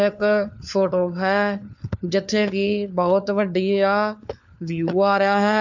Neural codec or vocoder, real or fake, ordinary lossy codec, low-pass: codec, 16 kHz, 4 kbps, FunCodec, trained on LibriTTS, 50 frames a second; fake; none; 7.2 kHz